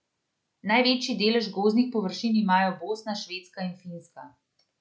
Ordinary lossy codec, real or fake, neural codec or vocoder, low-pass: none; real; none; none